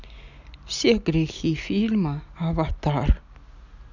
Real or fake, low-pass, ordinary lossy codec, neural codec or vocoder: real; 7.2 kHz; none; none